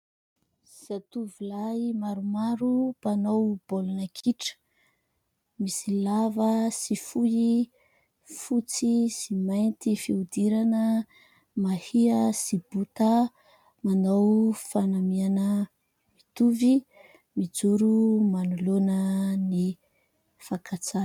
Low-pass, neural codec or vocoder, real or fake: 19.8 kHz; none; real